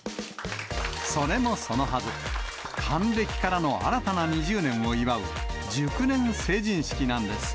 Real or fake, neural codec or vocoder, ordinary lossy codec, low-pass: real; none; none; none